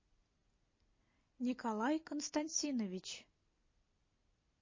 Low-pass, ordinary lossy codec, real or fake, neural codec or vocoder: 7.2 kHz; MP3, 32 kbps; real; none